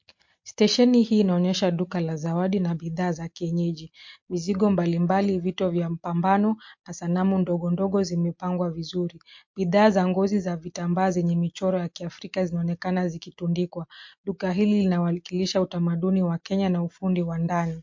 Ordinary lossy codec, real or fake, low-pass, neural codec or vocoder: MP3, 48 kbps; real; 7.2 kHz; none